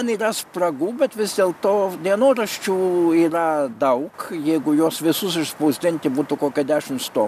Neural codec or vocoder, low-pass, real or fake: none; 14.4 kHz; real